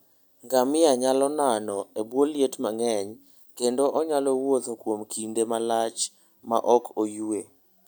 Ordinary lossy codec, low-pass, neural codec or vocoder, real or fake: none; none; none; real